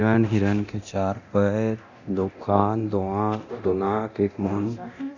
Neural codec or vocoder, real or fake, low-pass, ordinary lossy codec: codec, 24 kHz, 0.9 kbps, DualCodec; fake; 7.2 kHz; none